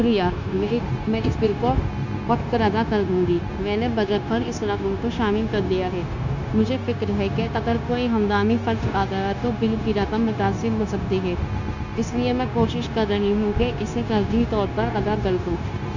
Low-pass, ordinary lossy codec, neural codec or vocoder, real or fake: 7.2 kHz; none; codec, 16 kHz, 0.9 kbps, LongCat-Audio-Codec; fake